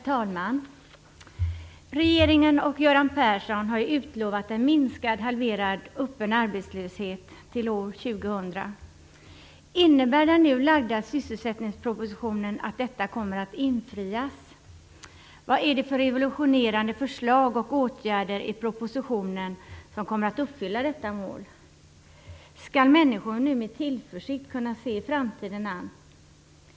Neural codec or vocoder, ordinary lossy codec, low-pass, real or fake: none; none; none; real